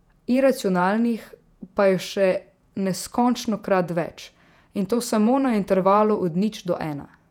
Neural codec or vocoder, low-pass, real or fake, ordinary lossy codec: vocoder, 48 kHz, 128 mel bands, Vocos; 19.8 kHz; fake; none